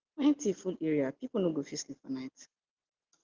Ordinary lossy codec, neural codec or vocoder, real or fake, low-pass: Opus, 16 kbps; none; real; 7.2 kHz